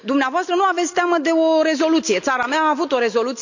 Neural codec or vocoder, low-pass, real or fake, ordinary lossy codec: none; 7.2 kHz; real; none